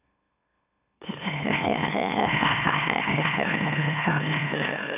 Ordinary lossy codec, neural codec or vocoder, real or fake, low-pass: none; autoencoder, 44.1 kHz, a latent of 192 numbers a frame, MeloTTS; fake; 3.6 kHz